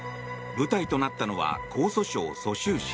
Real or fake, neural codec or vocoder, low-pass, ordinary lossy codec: real; none; none; none